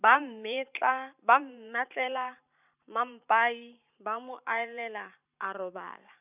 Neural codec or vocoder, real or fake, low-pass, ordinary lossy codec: vocoder, 44.1 kHz, 128 mel bands every 512 samples, BigVGAN v2; fake; 3.6 kHz; none